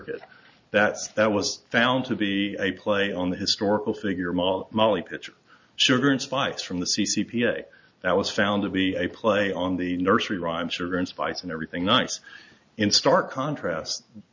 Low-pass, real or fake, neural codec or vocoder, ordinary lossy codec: 7.2 kHz; real; none; MP3, 64 kbps